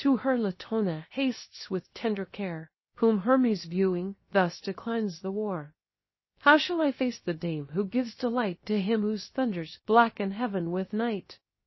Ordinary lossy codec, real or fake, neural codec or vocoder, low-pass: MP3, 24 kbps; fake; codec, 16 kHz, 0.7 kbps, FocalCodec; 7.2 kHz